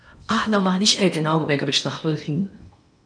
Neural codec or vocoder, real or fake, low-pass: codec, 16 kHz in and 24 kHz out, 0.8 kbps, FocalCodec, streaming, 65536 codes; fake; 9.9 kHz